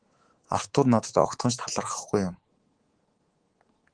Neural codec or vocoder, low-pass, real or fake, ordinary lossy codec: codec, 24 kHz, 3.1 kbps, DualCodec; 9.9 kHz; fake; Opus, 16 kbps